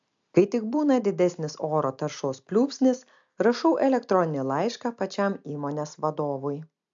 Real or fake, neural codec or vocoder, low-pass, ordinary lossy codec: real; none; 7.2 kHz; MP3, 64 kbps